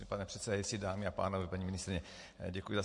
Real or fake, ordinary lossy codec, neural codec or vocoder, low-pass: real; MP3, 48 kbps; none; 10.8 kHz